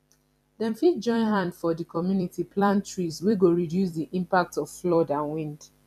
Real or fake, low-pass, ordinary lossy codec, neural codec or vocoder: fake; 14.4 kHz; none; vocoder, 44.1 kHz, 128 mel bands every 256 samples, BigVGAN v2